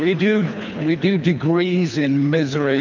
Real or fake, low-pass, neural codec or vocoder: fake; 7.2 kHz; codec, 24 kHz, 3 kbps, HILCodec